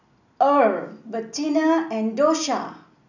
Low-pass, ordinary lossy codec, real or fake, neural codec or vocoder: 7.2 kHz; none; real; none